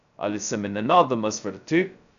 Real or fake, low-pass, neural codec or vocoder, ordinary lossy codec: fake; 7.2 kHz; codec, 16 kHz, 0.2 kbps, FocalCodec; none